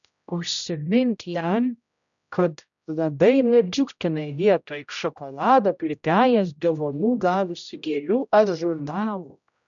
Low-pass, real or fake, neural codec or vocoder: 7.2 kHz; fake; codec, 16 kHz, 0.5 kbps, X-Codec, HuBERT features, trained on general audio